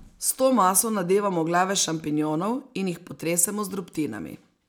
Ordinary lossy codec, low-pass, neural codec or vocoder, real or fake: none; none; none; real